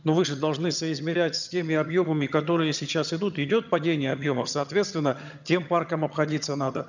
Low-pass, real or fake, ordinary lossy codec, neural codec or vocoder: 7.2 kHz; fake; none; vocoder, 22.05 kHz, 80 mel bands, HiFi-GAN